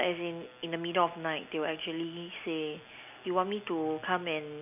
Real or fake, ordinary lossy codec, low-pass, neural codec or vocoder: real; none; 3.6 kHz; none